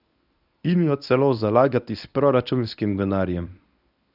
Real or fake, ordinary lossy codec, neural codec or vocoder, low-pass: fake; none; codec, 24 kHz, 0.9 kbps, WavTokenizer, medium speech release version 1; 5.4 kHz